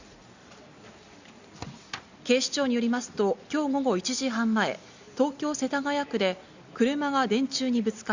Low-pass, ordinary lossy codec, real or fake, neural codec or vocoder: 7.2 kHz; Opus, 64 kbps; real; none